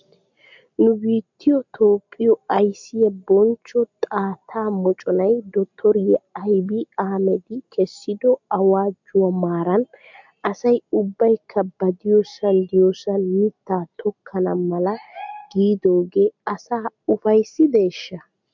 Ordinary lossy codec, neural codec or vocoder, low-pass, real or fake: MP3, 64 kbps; none; 7.2 kHz; real